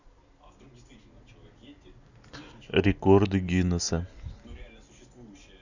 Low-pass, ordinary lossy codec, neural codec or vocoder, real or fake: 7.2 kHz; none; none; real